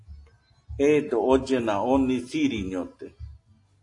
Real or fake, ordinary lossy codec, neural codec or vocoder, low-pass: real; AAC, 48 kbps; none; 10.8 kHz